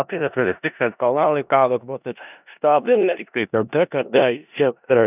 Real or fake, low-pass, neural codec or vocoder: fake; 3.6 kHz; codec, 16 kHz in and 24 kHz out, 0.4 kbps, LongCat-Audio-Codec, four codebook decoder